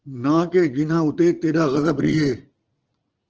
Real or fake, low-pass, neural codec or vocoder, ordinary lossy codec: fake; 7.2 kHz; vocoder, 44.1 kHz, 80 mel bands, Vocos; Opus, 16 kbps